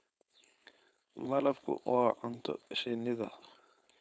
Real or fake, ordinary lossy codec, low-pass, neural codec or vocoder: fake; none; none; codec, 16 kHz, 4.8 kbps, FACodec